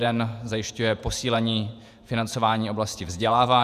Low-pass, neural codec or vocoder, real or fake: 14.4 kHz; vocoder, 48 kHz, 128 mel bands, Vocos; fake